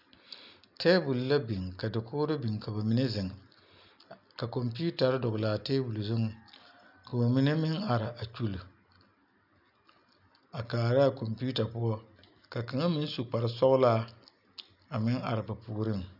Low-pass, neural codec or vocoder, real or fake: 5.4 kHz; none; real